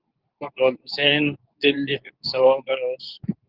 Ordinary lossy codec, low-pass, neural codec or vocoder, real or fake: Opus, 24 kbps; 5.4 kHz; codec, 24 kHz, 0.9 kbps, WavTokenizer, medium speech release version 2; fake